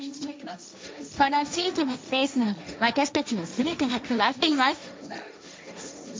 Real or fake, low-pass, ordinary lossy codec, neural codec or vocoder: fake; none; none; codec, 16 kHz, 1.1 kbps, Voila-Tokenizer